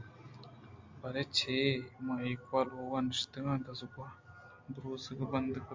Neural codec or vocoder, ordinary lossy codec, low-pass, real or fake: none; MP3, 64 kbps; 7.2 kHz; real